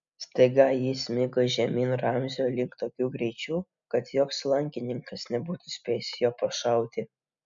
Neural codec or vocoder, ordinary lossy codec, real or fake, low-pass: none; MP3, 64 kbps; real; 7.2 kHz